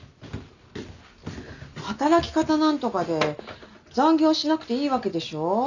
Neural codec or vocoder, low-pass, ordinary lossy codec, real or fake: none; 7.2 kHz; none; real